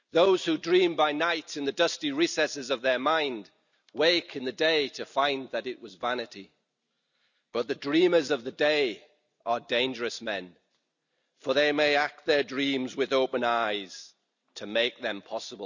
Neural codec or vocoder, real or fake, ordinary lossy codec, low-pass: none; real; none; 7.2 kHz